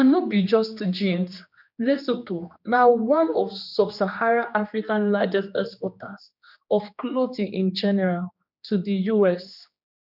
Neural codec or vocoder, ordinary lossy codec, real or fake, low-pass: codec, 16 kHz, 2 kbps, X-Codec, HuBERT features, trained on general audio; none; fake; 5.4 kHz